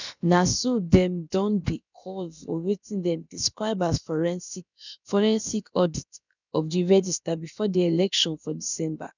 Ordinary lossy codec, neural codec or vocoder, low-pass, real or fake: none; codec, 16 kHz, about 1 kbps, DyCAST, with the encoder's durations; 7.2 kHz; fake